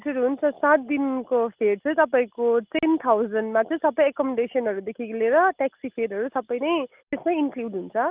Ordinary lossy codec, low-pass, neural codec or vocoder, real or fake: Opus, 24 kbps; 3.6 kHz; none; real